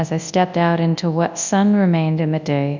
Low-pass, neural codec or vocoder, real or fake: 7.2 kHz; codec, 24 kHz, 0.9 kbps, WavTokenizer, large speech release; fake